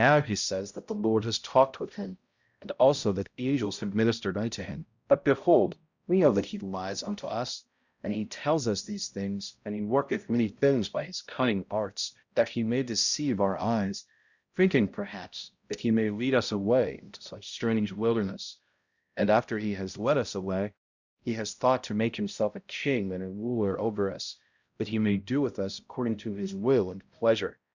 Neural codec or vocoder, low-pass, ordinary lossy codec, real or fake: codec, 16 kHz, 0.5 kbps, X-Codec, HuBERT features, trained on balanced general audio; 7.2 kHz; Opus, 64 kbps; fake